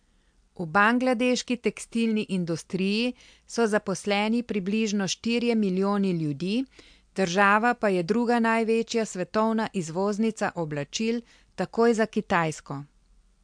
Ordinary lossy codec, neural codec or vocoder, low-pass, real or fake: MP3, 64 kbps; none; 9.9 kHz; real